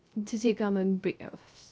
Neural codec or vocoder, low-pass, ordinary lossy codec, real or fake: codec, 16 kHz, 0.3 kbps, FocalCodec; none; none; fake